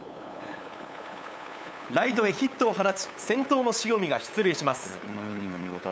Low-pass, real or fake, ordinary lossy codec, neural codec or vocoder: none; fake; none; codec, 16 kHz, 8 kbps, FunCodec, trained on LibriTTS, 25 frames a second